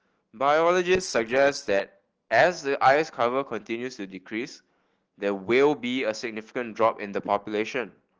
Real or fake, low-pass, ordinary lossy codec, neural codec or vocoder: fake; 7.2 kHz; Opus, 16 kbps; autoencoder, 48 kHz, 128 numbers a frame, DAC-VAE, trained on Japanese speech